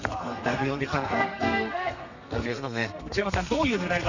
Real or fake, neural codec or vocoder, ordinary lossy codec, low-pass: fake; codec, 32 kHz, 1.9 kbps, SNAC; none; 7.2 kHz